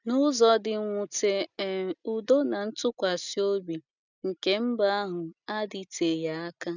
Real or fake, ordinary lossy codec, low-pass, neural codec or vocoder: real; none; 7.2 kHz; none